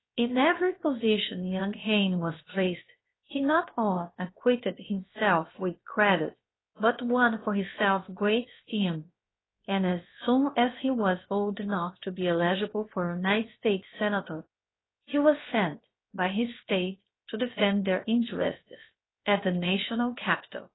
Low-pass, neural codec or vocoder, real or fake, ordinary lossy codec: 7.2 kHz; codec, 16 kHz, about 1 kbps, DyCAST, with the encoder's durations; fake; AAC, 16 kbps